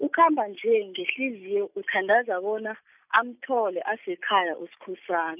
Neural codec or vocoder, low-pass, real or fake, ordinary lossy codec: none; 3.6 kHz; real; none